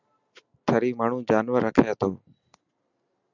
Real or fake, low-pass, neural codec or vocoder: real; 7.2 kHz; none